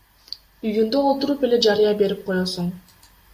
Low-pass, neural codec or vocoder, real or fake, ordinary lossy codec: 14.4 kHz; none; real; MP3, 64 kbps